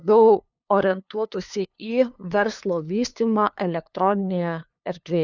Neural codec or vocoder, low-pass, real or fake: codec, 16 kHz, 2 kbps, FunCodec, trained on LibriTTS, 25 frames a second; 7.2 kHz; fake